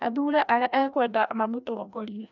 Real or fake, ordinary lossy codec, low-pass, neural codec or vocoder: fake; none; 7.2 kHz; codec, 16 kHz, 1 kbps, FreqCodec, larger model